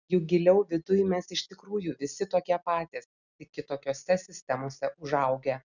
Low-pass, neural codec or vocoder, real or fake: 7.2 kHz; none; real